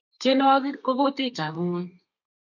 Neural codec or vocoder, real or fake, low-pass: codec, 32 kHz, 1.9 kbps, SNAC; fake; 7.2 kHz